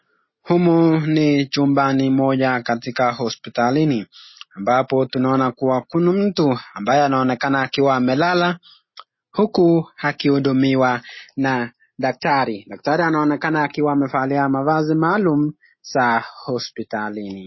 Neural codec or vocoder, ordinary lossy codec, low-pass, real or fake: none; MP3, 24 kbps; 7.2 kHz; real